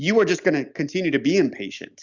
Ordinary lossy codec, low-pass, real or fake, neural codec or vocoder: Opus, 64 kbps; 7.2 kHz; real; none